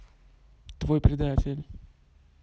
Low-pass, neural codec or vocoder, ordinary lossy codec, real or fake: none; none; none; real